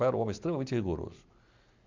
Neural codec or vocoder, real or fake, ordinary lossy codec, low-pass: none; real; MP3, 64 kbps; 7.2 kHz